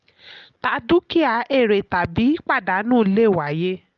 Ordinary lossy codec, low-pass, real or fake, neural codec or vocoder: Opus, 32 kbps; 7.2 kHz; real; none